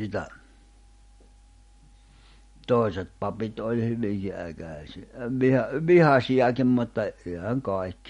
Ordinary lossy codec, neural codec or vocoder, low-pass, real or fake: MP3, 48 kbps; none; 10.8 kHz; real